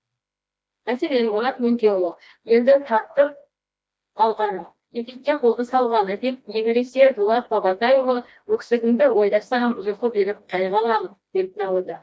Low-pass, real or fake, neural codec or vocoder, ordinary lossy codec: none; fake; codec, 16 kHz, 1 kbps, FreqCodec, smaller model; none